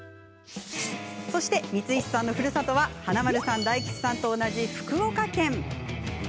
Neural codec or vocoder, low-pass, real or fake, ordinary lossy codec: none; none; real; none